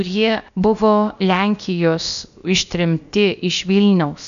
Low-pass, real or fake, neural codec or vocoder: 7.2 kHz; fake; codec, 16 kHz, about 1 kbps, DyCAST, with the encoder's durations